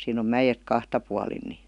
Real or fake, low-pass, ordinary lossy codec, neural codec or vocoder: real; 10.8 kHz; none; none